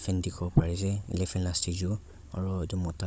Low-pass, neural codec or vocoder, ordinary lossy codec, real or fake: none; codec, 16 kHz, 16 kbps, FunCodec, trained on Chinese and English, 50 frames a second; none; fake